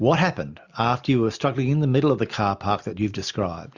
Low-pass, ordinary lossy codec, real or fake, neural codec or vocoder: 7.2 kHz; Opus, 64 kbps; real; none